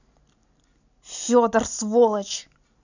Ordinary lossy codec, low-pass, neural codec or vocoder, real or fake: none; 7.2 kHz; none; real